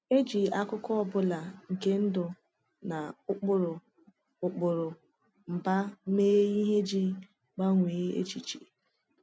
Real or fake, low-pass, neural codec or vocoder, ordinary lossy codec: real; none; none; none